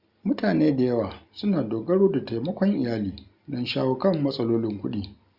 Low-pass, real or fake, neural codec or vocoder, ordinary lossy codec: 5.4 kHz; real; none; Opus, 64 kbps